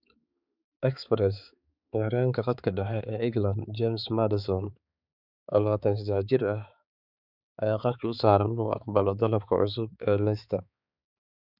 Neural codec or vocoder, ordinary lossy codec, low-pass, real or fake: codec, 16 kHz, 4 kbps, X-Codec, HuBERT features, trained on balanced general audio; none; 5.4 kHz; fake